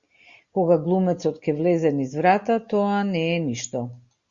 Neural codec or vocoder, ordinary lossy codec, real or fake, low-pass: none; Opus, 64 kbps; real; 7.2 kHz